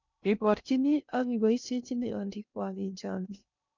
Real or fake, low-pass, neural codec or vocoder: fake; 7.2 kHz; codec, 16 kHz in and 24 kHz out, 0.6 kbps, FocalCodec, streaming, 2048 codes